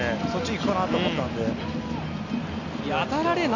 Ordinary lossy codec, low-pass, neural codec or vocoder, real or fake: none; 7.2 kHz; none; real